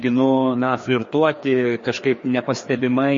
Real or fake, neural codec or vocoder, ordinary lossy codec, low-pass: fake; codec, 16 kHz, 2 kbps, FreqCodec, larger model; MP3, 32 kbps; 7.2 kHz